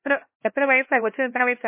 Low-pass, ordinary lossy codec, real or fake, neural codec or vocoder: 3.6 kHz; MP3, 24 kbps; fake; codec, 16 kHz, 1 kbps, X-Codec, HuBERT features, trained on LibriSpeech